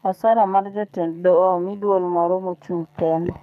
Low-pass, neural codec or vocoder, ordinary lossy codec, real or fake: 14.4 kHz; codec, 44.1 kHz, 2.6 kbps, SNAC; none; fake